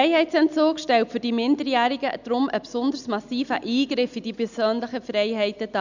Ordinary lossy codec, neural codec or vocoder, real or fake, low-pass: none; none; real; 7.2 kHz